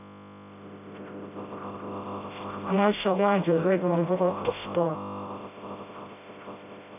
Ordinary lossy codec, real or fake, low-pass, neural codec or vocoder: none; fake; 3.6 kHz; codec, 16 kHz, 0.5 kbps, FreqCodec, smaller model